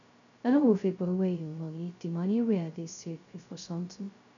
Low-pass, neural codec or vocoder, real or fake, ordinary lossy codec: 7.2 kHz; codec, 16 kHz, 0.2 kbps, FocalCodec; fake; none